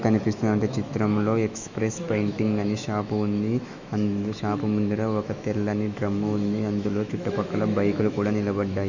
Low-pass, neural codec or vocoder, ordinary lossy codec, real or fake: 7.2 kHz; autoencoder, 48 kHz, 128 numbers a frame, DAC-VAE, trained on Japanese speech; Opus, 64 kbps; fake